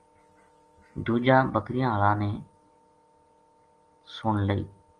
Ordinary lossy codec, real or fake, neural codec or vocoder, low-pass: Opus, 24 kbps; real; none; 9.9 kHz